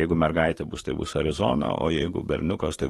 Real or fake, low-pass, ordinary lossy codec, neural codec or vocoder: fake; 19.8 kHz; AAC, 32 kbps; codec, 44.1 kHz, 7.8 kbps, DAC